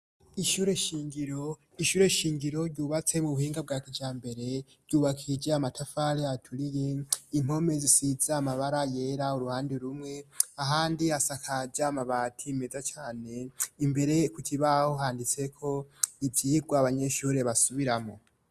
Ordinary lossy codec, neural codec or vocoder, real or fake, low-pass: Opus, 64 kbps; none; real; 14.4 kHz